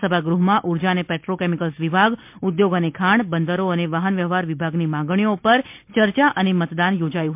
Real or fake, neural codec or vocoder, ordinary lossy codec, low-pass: real; none; MP3, 32 kbps; 3.6 kHz